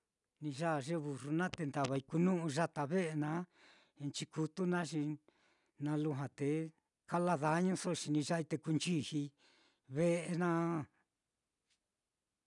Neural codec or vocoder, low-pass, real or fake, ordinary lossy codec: none; 10.8 kHz; real; none